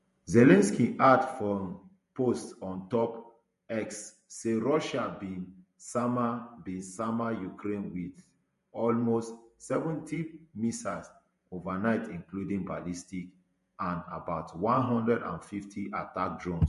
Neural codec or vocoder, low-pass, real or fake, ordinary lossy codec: vocoder, 48 kHz, 128 mel bands, Vocos; 14.4 kHz; fake; MP3, 48 kbps